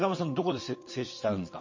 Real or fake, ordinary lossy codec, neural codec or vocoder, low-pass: fake; MP3, 32 kbps; vocoder, 22.05 kHz, 80 mel bands, WaveNeXt; 7.2 kHz